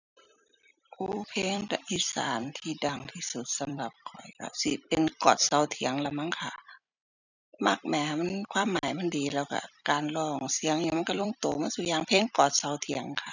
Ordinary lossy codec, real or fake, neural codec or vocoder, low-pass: none; fake; vocoder, 44.1 kHz, 128 mel bands every 256 samples, BigVGAN v2; 7.2 kHz